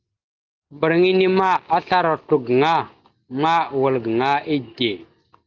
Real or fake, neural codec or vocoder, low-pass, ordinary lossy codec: real; none; 7.2 kHz; Opus, 32 kbps